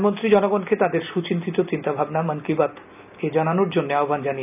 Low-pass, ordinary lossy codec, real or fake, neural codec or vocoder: 3.6 kHz; none; real; none